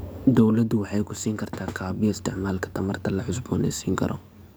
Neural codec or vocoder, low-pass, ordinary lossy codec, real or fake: codec, 44.1 kHz, 7.8 kbps, DAC; none; none; fake